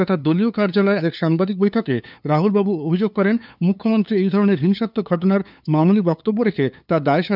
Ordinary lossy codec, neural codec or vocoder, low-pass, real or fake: none; codec, 16 kHz, 2 kbps, FunCodec, trained on LibriTTS, 25 frames a second; 5.4 kHz; fake